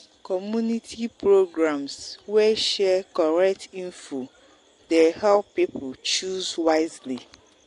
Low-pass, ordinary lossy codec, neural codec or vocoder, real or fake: 10.8 kHz; AAC, 48 kbps; none; real